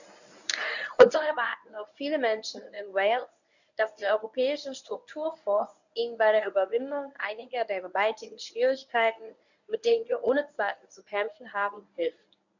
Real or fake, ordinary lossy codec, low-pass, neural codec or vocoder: fake; none; 7.2 kHz; codec, 24 kHz, 0.9 kbps, WavTokenizer, medium speech release version 1